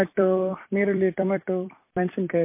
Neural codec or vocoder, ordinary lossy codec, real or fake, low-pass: vocoder, 44.1 kHz, 128 mel bands every 256 samples, BigVGAN v2; MP3, 24 kbps; fake; 3.6 kHz